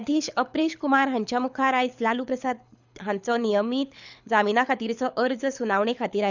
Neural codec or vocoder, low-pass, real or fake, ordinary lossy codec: codec, 24 kHz, 6 kbps, HILCodec; 7.2 kHz; fake; none